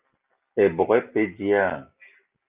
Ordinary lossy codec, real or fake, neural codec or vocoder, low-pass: Opus, 32 kbps; real; none; 3.6 kHz